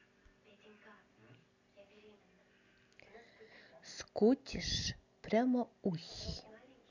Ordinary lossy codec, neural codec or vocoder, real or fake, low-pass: none; none; real; 7.2 kHz